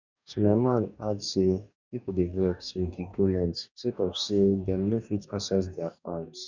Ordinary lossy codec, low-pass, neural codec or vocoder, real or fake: none; 7.2 kHz; codec, 44.1 kHz, 2.6 kbps, DAC; fake